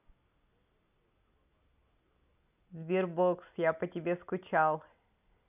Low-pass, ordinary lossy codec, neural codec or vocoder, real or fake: 3.6 kHz; none; none; real